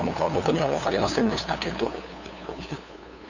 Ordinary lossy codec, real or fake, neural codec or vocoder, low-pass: none; fake; codec, 16 kHz, 2 kbps, FunCodec, trained on LibriTTS, 25 frames a second; 7.2 kHz